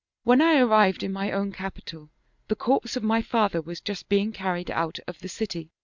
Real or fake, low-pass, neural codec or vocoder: real; 7.2 kHz; none